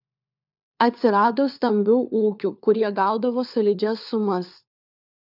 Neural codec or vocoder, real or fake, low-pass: codec, 16 kHz, 4 kbps, FunCodec, trained on LibriTTS, 50 frames a second; fake; 5.4 kHz